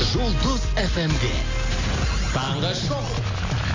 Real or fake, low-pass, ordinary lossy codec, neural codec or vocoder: real; 7.2 kHz; AAC, 32 kbps; none